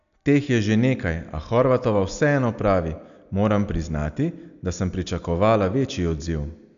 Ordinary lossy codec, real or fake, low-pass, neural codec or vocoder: none; real; 7.2 kHz; none